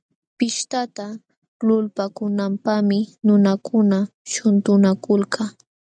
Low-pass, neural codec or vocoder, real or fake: 9.9 kHz; none; real